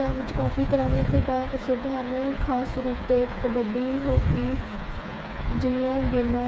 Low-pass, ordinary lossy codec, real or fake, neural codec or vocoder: none; none; fake; codec, 16 kHz, 4 kbps, FreqCodec, smaller model